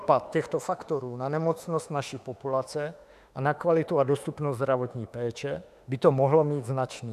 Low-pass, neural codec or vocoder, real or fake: 14.4 kHz; autoencoder, 48 kHz, 32 numbers a frame, DAC-VAE, trained on Japanese speech; fake